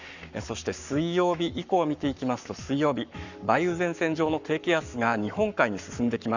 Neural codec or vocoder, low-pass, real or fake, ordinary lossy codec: codec, 44.1 kHz, 7.8 kbps, Pupu-Codec; 7.2 kHz; fake; none